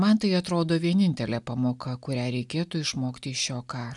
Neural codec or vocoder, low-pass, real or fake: none; 10.8 kHz; real